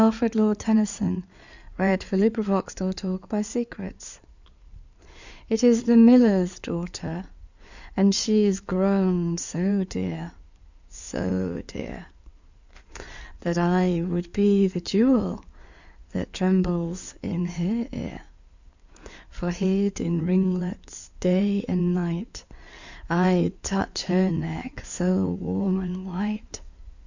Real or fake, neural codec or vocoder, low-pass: fake; codec, 16 kHz in and 24 kHz out, 2.2 kbps, FireRedTTS-2 codec; 7.2 kHz